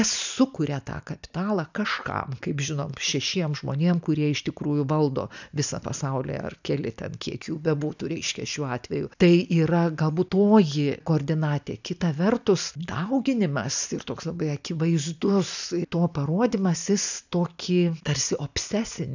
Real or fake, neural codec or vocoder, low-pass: real; none; 7.2 kHz